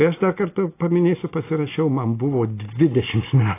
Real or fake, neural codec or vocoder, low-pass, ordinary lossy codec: real; none; 3.6 kHz; AAC, 24 kbps